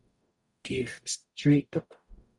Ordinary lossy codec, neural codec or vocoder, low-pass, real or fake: Opus, 64 kbps; codec, 44.1 kHz, 0.9 kbps, DAC; 10.8 kHz; fake